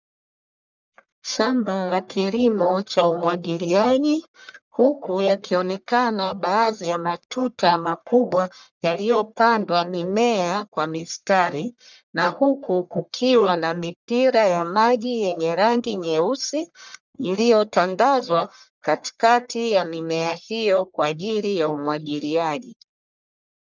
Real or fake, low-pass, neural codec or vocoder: fake; 7.2 kHz; codec, 44.1 kHz, 1.7 kbps, Pupu-Codec